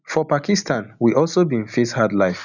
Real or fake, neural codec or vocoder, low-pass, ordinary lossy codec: real; none; 7.2 kHz; none